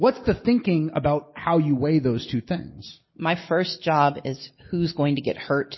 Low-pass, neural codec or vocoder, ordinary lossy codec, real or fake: 7.2 kHz; none; MP3, 24 kbps; real